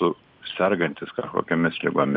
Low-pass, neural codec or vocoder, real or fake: 5.4 kHz; none; real